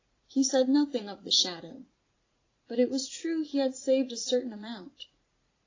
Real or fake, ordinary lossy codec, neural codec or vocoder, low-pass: fake; AAC, 32 kbps; vocoder, 44.1 kHz, 80 mel bands, Vocos; 7.2 kHz